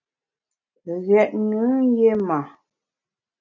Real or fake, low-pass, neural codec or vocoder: real; 7.2 kHz; none